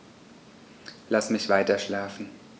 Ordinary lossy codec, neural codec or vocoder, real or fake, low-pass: none; none; real; none